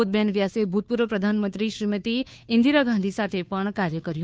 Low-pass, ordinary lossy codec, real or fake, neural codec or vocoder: none; none; fake; codec, 16 kHz, 2 kbps, FunCodec, trained on Chinese and English, 25 frames a second